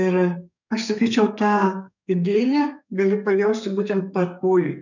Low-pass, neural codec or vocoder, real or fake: 7.2 kHz; codec, 32 kHz, 1.9 kbps, SNAC; fake